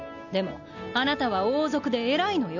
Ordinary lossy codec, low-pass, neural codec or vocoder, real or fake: none; 7.2 kHz; none; real